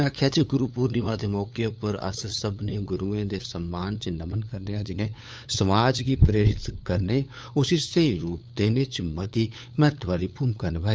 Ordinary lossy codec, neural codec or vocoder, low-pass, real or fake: none; codec, 16 kHz, 8 kbps, FunCodec, trained on LibriTTS, 25 frames a second; none; fake